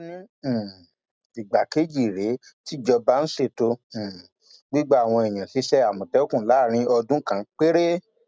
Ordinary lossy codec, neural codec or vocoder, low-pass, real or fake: none; none; none; real